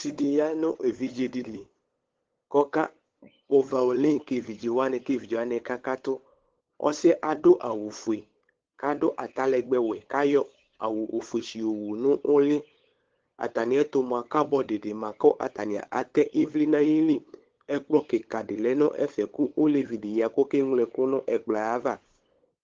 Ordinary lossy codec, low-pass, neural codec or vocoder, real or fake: Opus, 16 kbps; 7.2 kHz; codec, 16 kHz, 8 kbps, FunCodec, trained on LibriTTS, 25 frames a second; fake